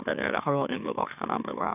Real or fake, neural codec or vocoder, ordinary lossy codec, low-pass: fake; autoencoder, 44.1 kHz, a latent of 192 numbers a frame, MeloTTS; none; 3.6 kHz